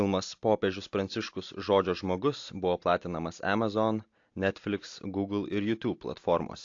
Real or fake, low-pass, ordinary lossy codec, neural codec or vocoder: real; 7.2 kHz; AAC, 48 kbps; none